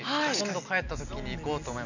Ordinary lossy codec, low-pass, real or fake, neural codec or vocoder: none; 7.2 kHz; real; none